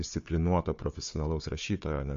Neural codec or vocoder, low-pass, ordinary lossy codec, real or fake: codec, 16 kHz, 4 kbps, FunCodec, trained on Chinese and English, 50 frames a second; 7.2 kHz; MP3, 48 kbps; fake